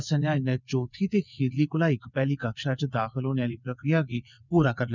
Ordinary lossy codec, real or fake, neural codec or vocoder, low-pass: none; fake; vocoder, 22.05 kHz, 80 mel bands, WaveNeXt; 7.2 kHz